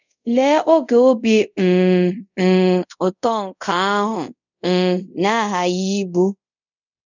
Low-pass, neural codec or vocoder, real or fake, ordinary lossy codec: 7.2 kHz; codec, 24 kHz, 0.5 kbps, DualCodec; fake; none